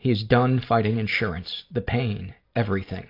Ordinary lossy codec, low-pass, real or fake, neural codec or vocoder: AAC, 32 kbps; 5.4 kHz; real; none